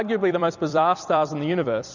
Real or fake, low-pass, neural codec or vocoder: fake; 7.2 kHz; vocoder, 44.1 kHz, 128 mel bands every 256 samples, BigVGAN v2